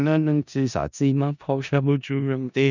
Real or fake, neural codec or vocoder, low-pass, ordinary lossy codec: fake; codec, 16 kHz in and 24 kHz out, 0.4 kbps, LongCat-Audio-Codec, four codebook decoder; 7.2 kHz; none